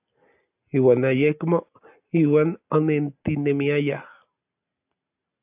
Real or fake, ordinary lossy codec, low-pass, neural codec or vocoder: real; AAC, 32 kbps; 3.6 kHz; none